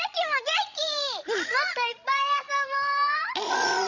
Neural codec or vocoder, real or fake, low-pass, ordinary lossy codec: codec, 16 kHz, 16 kbps, FreqCodec, larger model; fake; 7.2 kHz; none